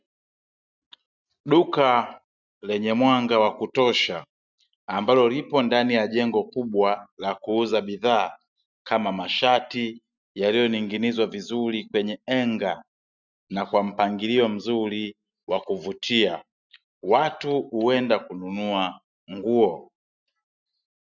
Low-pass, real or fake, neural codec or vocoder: 7.2 kHz; real; none